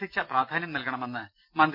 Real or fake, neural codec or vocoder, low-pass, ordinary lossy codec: real; none; 5.4 kHz; none